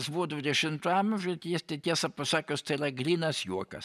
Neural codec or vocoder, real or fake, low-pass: none; real; 14.4 kHz